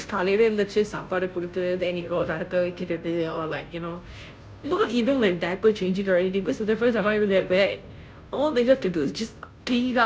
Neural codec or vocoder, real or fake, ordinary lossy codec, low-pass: codec, 16 kHz, 0.5 kbps, FunCodec, trained on Chinese and English, 25 frames a second; fake; none; none